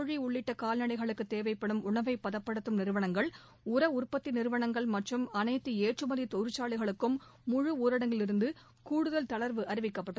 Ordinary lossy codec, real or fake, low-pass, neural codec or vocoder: none; real; none; none